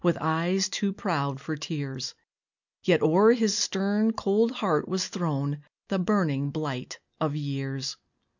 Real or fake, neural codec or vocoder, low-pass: real; none; 7.2 kHz